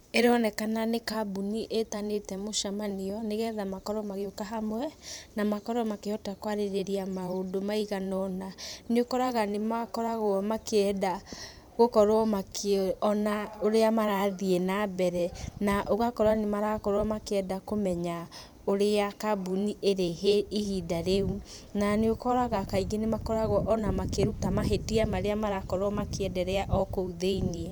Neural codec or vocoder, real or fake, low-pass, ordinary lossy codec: vocoder, 44.1 kHz, 128 mel bands every 512 samples, BigVGAN v2; fake; none; none